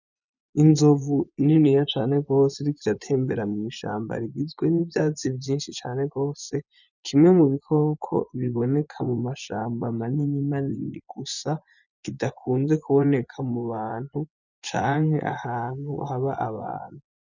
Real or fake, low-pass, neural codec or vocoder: fake; 7.2 kHz; vocoder, 24 kHz, 100 mel bands, Vocos